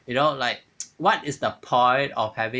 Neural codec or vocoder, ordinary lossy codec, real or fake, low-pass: none; none; real; none